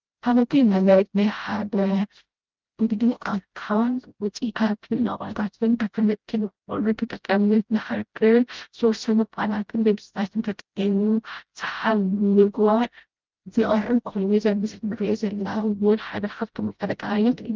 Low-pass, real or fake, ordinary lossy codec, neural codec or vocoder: 7.2 kHz; fake; Opus, 32 kbps; codec, 16 kHz, 0.5 kbps, FreqCodec, smaller model